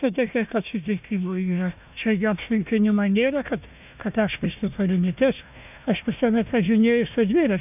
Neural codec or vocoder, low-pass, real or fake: codec, 16 kHz, 1 kbps, FunCodec, trained on Chinese and English, 50 frames a second; 3.6 kHz; fake